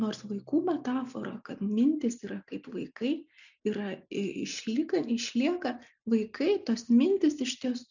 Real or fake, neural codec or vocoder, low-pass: real; none; 7.2 kHz